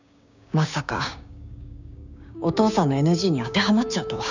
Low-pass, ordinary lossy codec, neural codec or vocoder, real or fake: 7.2 kHz; none; codec, 16 kHz, 6 kbps, DAC; fake